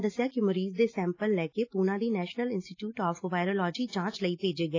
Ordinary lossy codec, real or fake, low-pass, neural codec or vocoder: AAC, 32 kbps; real; 7.2 kHz; none